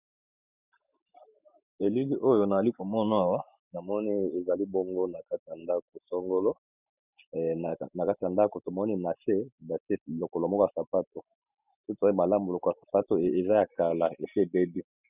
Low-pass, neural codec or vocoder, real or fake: 3.6 kHz; none; real